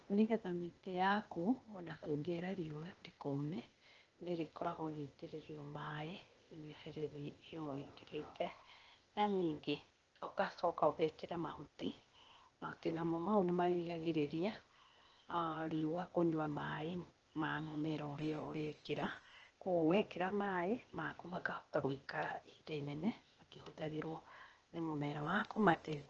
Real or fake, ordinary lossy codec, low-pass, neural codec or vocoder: fake; Opus, 24 kbps; 7.2 kHz; codec, 16 kHz, 0.8 kbps, ZipCodec